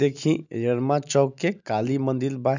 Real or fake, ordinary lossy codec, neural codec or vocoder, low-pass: real; none; none; 7.2 kHz